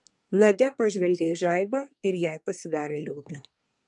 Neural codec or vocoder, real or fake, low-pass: codec, 24 kHz, 1 kbps, SNAC; fake; 10.8 kHz